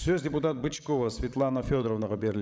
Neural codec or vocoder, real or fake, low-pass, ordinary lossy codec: codec, 16 kHz, 16 kbps, FreqCodec, larger model; fake; none; none